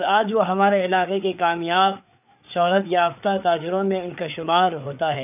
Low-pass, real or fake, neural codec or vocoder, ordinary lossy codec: 3.6 kHz; fake; codec, 16 kHz, 4 kbps, FreqCodec, larger model; none